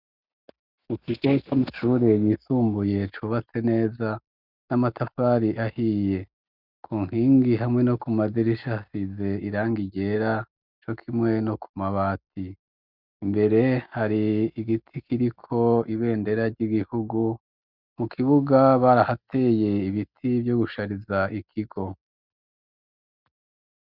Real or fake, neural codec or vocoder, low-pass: real; none; 5.4 kHz